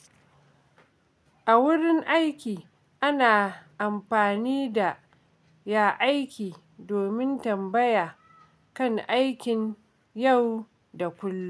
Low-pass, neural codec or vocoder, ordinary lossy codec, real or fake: none; none; none; real